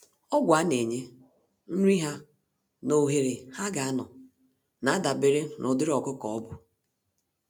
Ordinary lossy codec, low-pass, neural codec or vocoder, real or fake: none; none; none; real